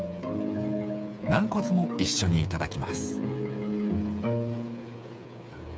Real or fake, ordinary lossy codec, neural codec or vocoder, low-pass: fake; none; codec, 16 kHz, 8 kbps, FreqCodec, smaller model; none